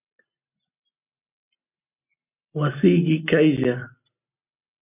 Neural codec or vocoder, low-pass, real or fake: none; 3.6 kHz; real